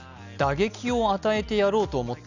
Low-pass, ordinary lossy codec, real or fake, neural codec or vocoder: 7.2 kHz; none; real; none